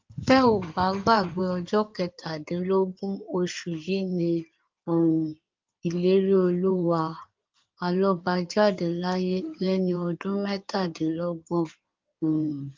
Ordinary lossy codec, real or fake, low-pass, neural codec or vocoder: Opus, 32 kbps; fake; 7.2 kHz; codec, 16 kHz in and 24 kHz out, 2.2 kbps, FireRedTTS-2 codec